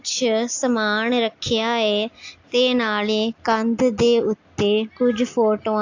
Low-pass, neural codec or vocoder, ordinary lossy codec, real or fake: 7.2 kHz; none; AAC, 48 kbps; real